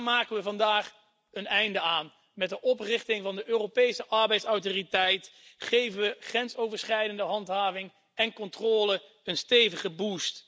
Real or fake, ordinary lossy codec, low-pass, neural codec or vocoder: real; none; none; none